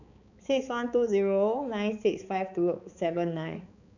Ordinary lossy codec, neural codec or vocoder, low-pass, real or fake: none; codec, 16 kHz, 4 kbps, X-Codec, HuBERT features, trained on balanced general audio; 7.2 kHz; fake